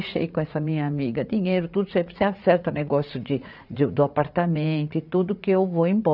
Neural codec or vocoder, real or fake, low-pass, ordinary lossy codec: vocoder, 44.1 kHz, 80 mel bands, Vocos; fake; 5.4 kHz; none